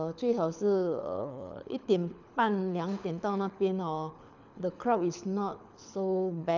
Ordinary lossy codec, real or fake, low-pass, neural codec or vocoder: none; fake; 7.2 kHz; codec, 24 kHz, 6 kbps, HILCodec